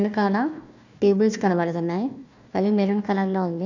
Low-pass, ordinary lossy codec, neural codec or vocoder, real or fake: 7.2 kHz; none; codec, 16 kHz, 1 kbps, FunCodec, trained on Chinese and English, 50 frames a second; fake